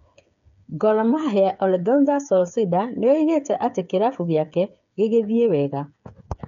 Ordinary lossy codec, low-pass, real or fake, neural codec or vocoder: none; 7.2 kHz; fake; codec, 16 kHz, 8 kbps, FreqCodec, smaller model